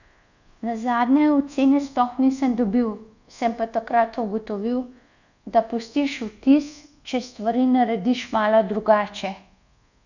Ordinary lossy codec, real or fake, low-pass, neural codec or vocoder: none; fake; 7.2 kHz; codec, 24 kHz, 1.2 kbps, DualCodec